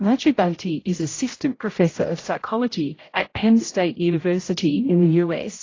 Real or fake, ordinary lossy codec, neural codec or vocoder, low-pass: fake; AAC, 32 kbps; codec, 16 kHz, 0.5 kbps, X-Codec, HuBERT features, trained on general audio; 7.2 kHz